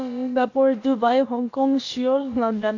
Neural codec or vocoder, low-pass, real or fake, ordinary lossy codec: codec, 16 kHz, about 1 kbps, DyCAST, with the encoder's durations; 7.2 kHz; fake; AAC, 48 kbps